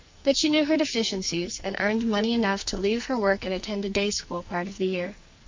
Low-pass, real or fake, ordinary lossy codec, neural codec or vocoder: 7.2 kHz; fake; MP3, 64 kbps; codec, 44.1 kHz, 2.6 kbps, SNAC